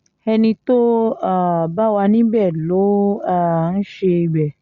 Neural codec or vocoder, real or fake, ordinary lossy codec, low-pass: none; real; Opus, 64 kbps; 7.2 kHz